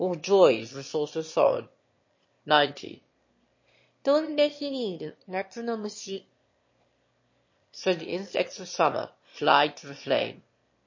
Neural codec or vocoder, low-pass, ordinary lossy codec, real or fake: autoencoder, 22.05 kHz, a latent of 192 numbers a frame, VITS, trained on one speaker; 7.2 kHz; MP3, 32 kbps; fake